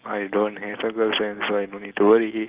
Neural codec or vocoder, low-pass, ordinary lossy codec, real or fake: none; 3.6 kHz; Opus, 16 kbps; real